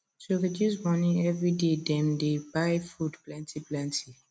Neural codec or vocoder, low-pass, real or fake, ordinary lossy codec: none; none; real; none